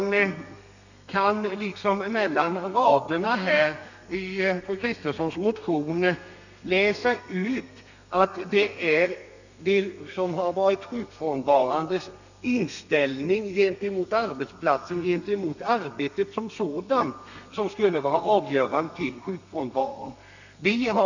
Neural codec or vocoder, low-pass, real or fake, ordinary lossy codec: codec, 32 kHz, 1.9 kbps, SNAC; 7.2 kHz; fake; none